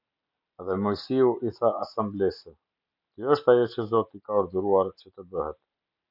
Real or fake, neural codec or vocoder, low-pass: real; none; 5.4 kHz